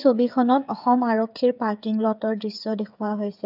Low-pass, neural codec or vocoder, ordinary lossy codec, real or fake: 5.4 kHz; codec, 16 kHz, 8 kbps, FreqCodec, smaller model; none; fake